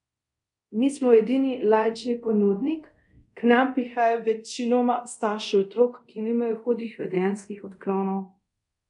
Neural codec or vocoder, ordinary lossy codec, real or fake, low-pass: codec, 24 kHz, 0.5 kbps, DualCodec; MP3, 96 kbps; fake; 10.8 kHz